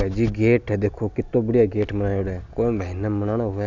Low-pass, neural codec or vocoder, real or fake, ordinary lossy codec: 7.2 kHz; none; real; none